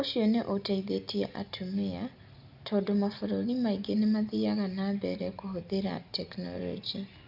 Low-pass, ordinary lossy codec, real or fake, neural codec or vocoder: 5.4 kHz; none; real; none